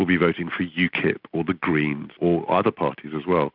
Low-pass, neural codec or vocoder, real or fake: 5.4 kHz; none; real